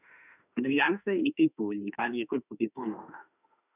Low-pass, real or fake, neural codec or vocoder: 3.6 kHz; fake; autoencoder, 48 kHz, 32 numbers a frame, DAC-VAE, trained on Japanese speech